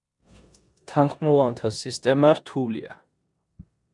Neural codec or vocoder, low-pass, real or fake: codec, 16 kHz in and 24 kHz out, 0.9 kbps, LongCat-Audio-Codec, four codebook decoder; 10.8 kHz; fake